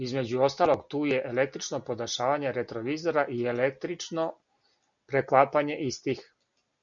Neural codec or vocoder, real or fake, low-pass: none; real; 7.2 kHz